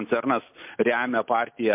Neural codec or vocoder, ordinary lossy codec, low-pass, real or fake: none; MP3, 32 kbps; 3.6 kHz; real